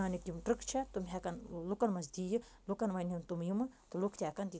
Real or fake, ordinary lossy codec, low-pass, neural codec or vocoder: real; none; none; none